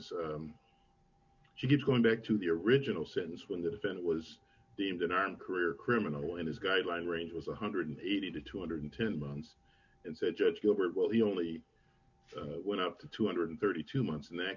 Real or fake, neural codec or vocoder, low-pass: real; none; 7.2 kHz